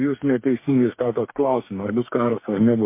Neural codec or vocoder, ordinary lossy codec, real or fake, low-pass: codec, 44.1 kHz, 2.6 kbps, DAC; MP3, 24 kbps; fake; 3.6 kHz